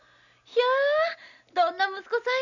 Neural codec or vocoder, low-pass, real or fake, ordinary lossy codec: none; 7.2 kHz; real; none